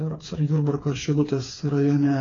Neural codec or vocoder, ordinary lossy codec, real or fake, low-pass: codec, 16 kHz, 4 kbps, FreqCodec, smaller model; AAC, 32 kbps; fake; 7.2 kHz